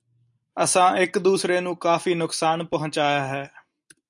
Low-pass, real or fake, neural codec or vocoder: 10.8 kHz; real; none